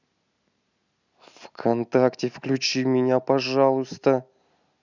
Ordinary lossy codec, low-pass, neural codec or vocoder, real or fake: none; 7.2 kHz; none; real